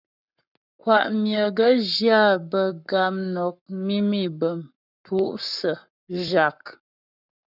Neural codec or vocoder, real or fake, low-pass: codec, 44.1 kHz, 7.8 kbps, Pupu-Codec; fake; 5.4 kHz